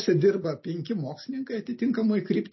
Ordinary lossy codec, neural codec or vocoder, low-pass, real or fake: MP3, 24 kbps; none; 7.2 kHz; real